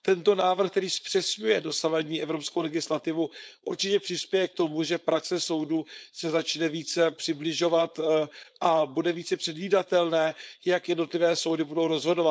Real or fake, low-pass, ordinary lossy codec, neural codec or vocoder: fake; none; none; codec, 16 kHz, 4.8 kbps, FACodec